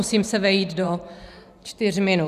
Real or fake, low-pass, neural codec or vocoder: fake; 14.4 kHz; vocoder, 44.1 kHz, 128 mel bands every 512 samples, BigVGAN v2